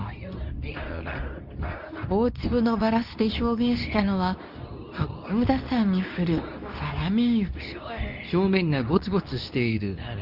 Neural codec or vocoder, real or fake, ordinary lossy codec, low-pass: codec, 24 kHz, 0.9 kbps, WavTokenizer, medium speech release version 2; fake; none; 5.4 kHz